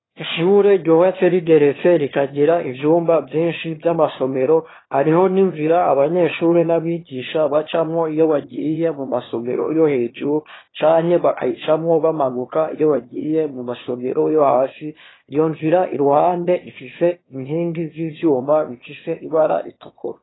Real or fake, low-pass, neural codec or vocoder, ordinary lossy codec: fake; 7.2 kHz; autoencoder, 22.05 kHz, a latent of 192 numbers a frame, VITS, trained on one speaker; AAC, 16 kbps